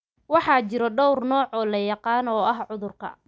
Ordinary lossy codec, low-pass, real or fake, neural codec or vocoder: none; none; real; none